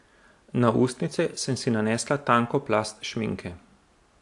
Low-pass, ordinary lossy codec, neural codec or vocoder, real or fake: 10.8 kHz; AAC, 64 kbps; none; real